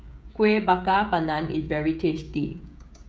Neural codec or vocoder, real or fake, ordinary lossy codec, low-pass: codec, 16 kHz, 16 kbps, FreqCodec, smaller model; fake; none; none